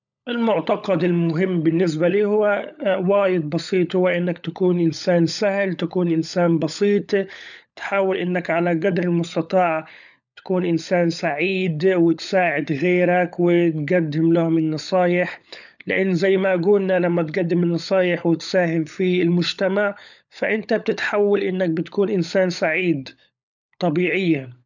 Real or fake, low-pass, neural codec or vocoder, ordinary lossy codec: fake; 7.2 kHz; codec, 16 kHz, 16 kbps, FunCodec, trained on LibriTTS, 50 frames a second; none